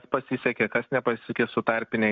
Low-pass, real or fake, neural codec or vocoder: 7.2 kHz; real; none